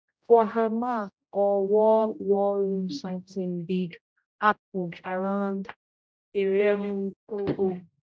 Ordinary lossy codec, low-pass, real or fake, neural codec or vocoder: none; none; fake; codec, 16 kHz, 0.5 kbps, X-Codec, HuBERT features, trained on general audio